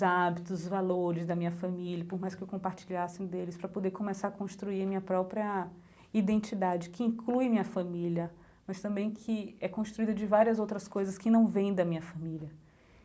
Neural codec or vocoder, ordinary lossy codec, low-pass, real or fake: none; none; none; real